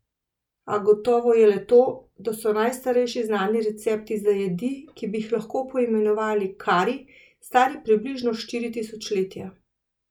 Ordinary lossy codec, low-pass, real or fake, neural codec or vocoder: none; 19.8 kHz; real; none